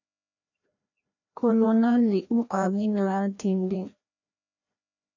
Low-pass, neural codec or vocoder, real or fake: 7.2 kHz; codec, 16 kHz, 1 kbps, FreqCodec, larger model; fake